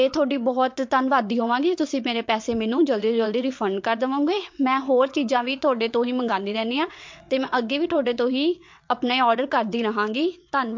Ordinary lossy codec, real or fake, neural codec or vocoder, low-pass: MP3, 48 kbps; fake; vocoder, 22.05 kHz, 80 mel bands, WaveNeXt; 7.2 kHz